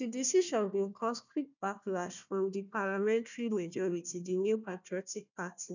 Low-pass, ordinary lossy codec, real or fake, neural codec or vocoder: 7.2 kHz; none; fake; codec, 16 kHz, 1 kbps, FunCodec, trained on Chinese and English, 50 frames a second